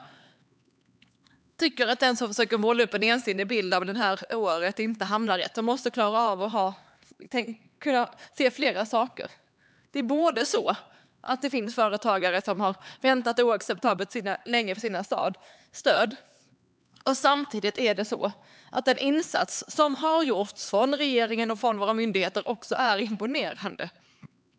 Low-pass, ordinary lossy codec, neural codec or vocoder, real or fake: none; none; codec, 16 kHz, 4 kbps, X-Codec, HuBERT features, trained on LibriSpeech; fake